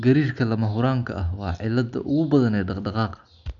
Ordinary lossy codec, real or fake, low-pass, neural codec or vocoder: none; real; 7.2 kHz; none